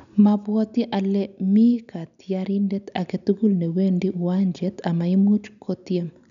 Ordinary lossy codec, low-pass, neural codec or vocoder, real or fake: none; 7.2 kHz; none; real